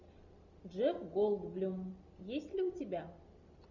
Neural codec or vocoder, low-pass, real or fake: none; 7.2 kHz; real